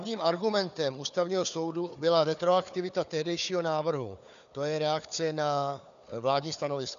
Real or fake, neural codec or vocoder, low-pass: fake; codec, 16 kHz, 4 kbps, FunCodec, trained on Chinese and English, 50 frames a second; 7.2 kHz